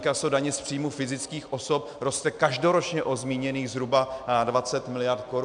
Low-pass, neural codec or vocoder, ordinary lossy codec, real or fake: 9.9 kHz; none; MP3, 96 kbps; real